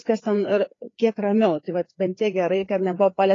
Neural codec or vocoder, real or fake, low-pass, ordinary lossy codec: codec, 16 kHz, 4 kbps, FreqCodec, larger model; fake; 7.2 kHz; AAC, 32 kbps